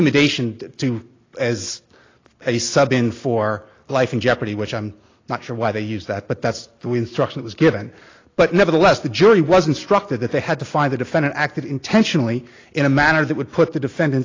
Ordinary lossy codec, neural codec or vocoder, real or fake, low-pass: AAC, 32 kbps; none; real; 7.2 kHz